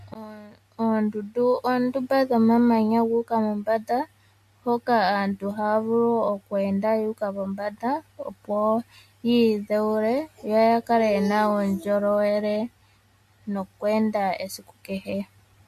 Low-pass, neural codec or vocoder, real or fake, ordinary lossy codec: 14.4 kHz; none; real; MP3, 64 kbps